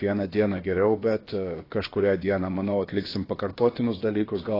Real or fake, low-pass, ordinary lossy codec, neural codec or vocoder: fake; 5.4 kHz; AAC, 24 kbps; codec, 16 kHz, about 1 kbps, DyCAST, with the encoder's durations